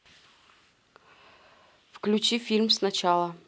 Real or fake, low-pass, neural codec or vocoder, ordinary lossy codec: real; none; none; none